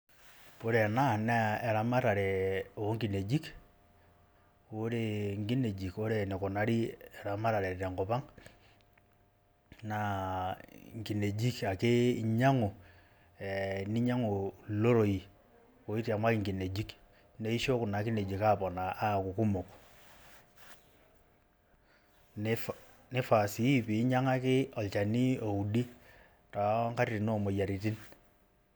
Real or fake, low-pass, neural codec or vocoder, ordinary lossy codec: real; none; none; none